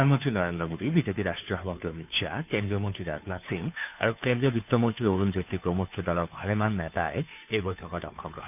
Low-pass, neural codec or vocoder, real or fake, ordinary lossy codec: 3.6 kHz; codec, 16 kHz, 2 kbps, FunCodec, trained on Chinese and English, 25 frames a second; fake; none